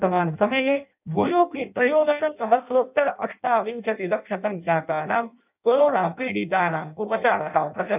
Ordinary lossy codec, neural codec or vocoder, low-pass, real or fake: none; codec, 16 kHz in and 24 kHz out, 0.6 kbps, FireRedTTS-2 codec; 3.6 kHz; fake